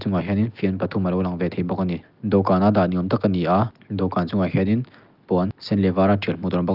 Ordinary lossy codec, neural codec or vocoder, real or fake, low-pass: Opus, 32 kbps; none; real; 5.4 kHz